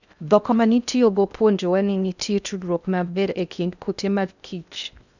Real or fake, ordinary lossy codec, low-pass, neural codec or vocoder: fake; none; 7.2 kHz; codec, 16 kHz in and 24 kHz out, 0.6 kbps, FocalCodec, streaming, 4096 codes